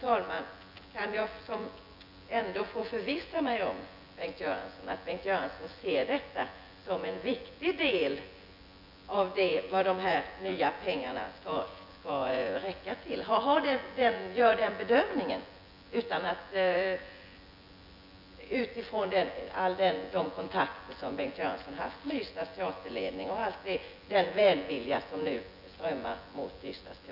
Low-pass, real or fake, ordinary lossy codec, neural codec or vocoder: 5.4 kHz; fake; none; vocoder, 24 kHz, 100 mel bands, Vocos